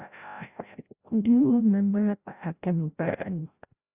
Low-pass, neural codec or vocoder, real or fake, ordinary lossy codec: 3.6 kHz; codec, 16 kHz, 0.5 kbps, FreqCodec, larger model; fake; Opus, 64 kbps